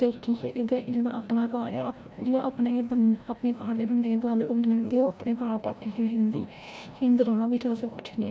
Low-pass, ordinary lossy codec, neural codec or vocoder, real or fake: none; none; codec, 16 kHz, 0.5 kbps, FreqCodec, larger model; fake